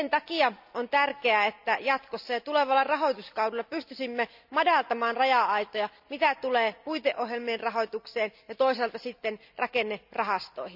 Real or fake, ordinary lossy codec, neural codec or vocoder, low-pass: real; none; none; 5.4 kHz